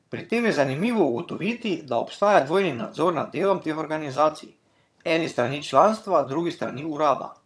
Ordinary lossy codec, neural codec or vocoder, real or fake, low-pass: none; vocoder, 22.05 kHz, 80 mel bands, HiFi-GAN; fake; none